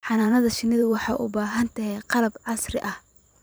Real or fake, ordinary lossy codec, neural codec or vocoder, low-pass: fake; none; vocoder, 44.1 kHz, 128 mel bands every 512 samples, BigVGAN v2; none